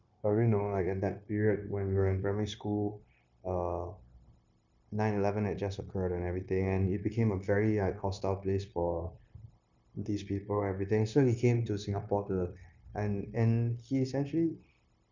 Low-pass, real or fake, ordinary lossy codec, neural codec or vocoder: 7.2 kHz; fake; none; codec, 16 kHz, 0.9 kbps, LongCat-Audio-Codec